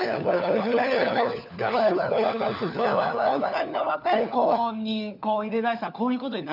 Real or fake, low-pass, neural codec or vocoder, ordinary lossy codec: fake; 5.4 kHz; codec, 16 kHz, 4 kbps, FunCodec, trained on LibriTTS, 50 frames a second; none